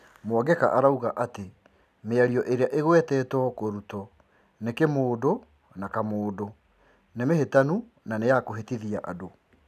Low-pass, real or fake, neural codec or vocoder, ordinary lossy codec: 14.4 kHz; real; none; none